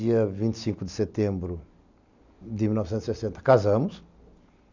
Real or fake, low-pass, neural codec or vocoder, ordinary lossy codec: real; 7.2 kHz; none; none